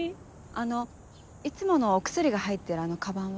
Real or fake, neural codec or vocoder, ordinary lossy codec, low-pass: real; none; none; none